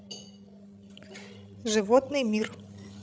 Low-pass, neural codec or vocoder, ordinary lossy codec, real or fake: none; codec, 16 kHz, 16 kbps, FreqCodec, larger model; none; fake